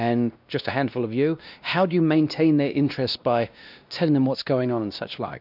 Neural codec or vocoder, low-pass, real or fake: codec, 16 kHz, 1 kbps, X-Codec, WavLM features, trained on Multilingual LibriSpeech; 5.4 kHz; fake